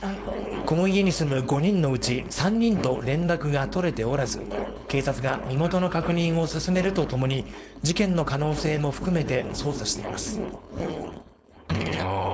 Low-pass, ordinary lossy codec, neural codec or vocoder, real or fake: none; none; codec, 16 kHz, 4.8 kbps, FACodec; fake